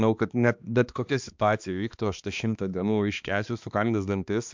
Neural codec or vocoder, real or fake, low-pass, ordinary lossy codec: codec, 16 kHz, 2 kbps, X-Codec, HuBERT features, trained on balanced general audio; fake; 7.2 kHz; MP3, 64 kbps